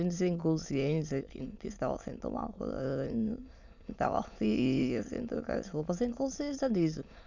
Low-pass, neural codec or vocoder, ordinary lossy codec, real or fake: 7.2 kHz; autoencoder, 22.05 kHz, a latent of 192 numbers a frame, VITS, trained on many speakers; none; fake